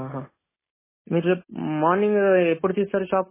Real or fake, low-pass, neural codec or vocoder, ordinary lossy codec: real; 3.6 kHz; none; MP3, 16 kbps